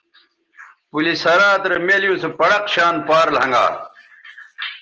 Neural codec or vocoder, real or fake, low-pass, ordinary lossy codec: none; real; 7.2 kHz; Opus, 16 kbps